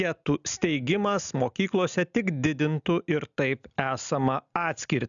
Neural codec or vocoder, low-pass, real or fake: none; 7.2 kHz; real